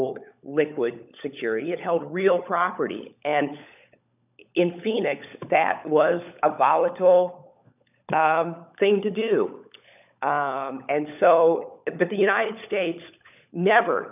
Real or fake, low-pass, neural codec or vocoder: fake; 3.6 kHz; codec, 16 kHz, 16 kbps, FunCodec, trained on LibriTTS, 50 frames a second